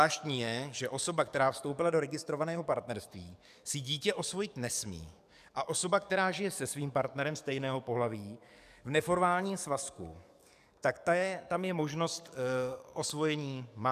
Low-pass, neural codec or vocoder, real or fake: 14.4 kHz; codec, 44.1 kHz, 7.8 kbps, DAC; fake